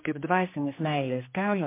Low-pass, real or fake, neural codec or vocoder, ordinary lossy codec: 3.6 kHz; fake; codec, 16 kHz, 2 kbps, X-Codec, HuBERT features, trained on general audio; MP3, 24 kbps